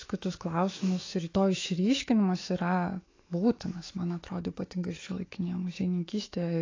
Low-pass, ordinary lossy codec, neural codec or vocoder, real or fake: 7.2 kHz; AAC, 32 kbps; autoencoder, 48 kHz, 128 numbers a frame, DAC-VAE, trained on Japanese speech; fake